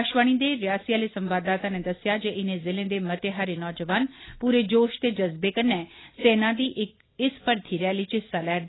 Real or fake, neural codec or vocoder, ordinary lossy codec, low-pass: real; none; AAC, 16 kbps; 7.2 kHz